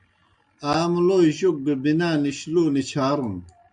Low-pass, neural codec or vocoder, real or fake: 9.9 kHz; none; real